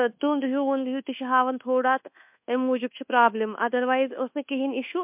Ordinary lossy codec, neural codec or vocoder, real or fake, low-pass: MP3, 32 kbps; codec, 24 kHz, 1.2 kbps, DualCodec; fake; 3.6 kHz